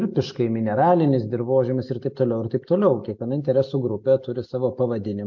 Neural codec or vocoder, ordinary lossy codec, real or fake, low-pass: none; MP3, 48 kbps; real; 7.2 kHz